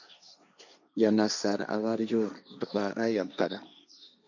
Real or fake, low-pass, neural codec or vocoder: fake; 7.2 kHz; codec, 16 kHz, 1.1 kbps, Voila-Tokenizer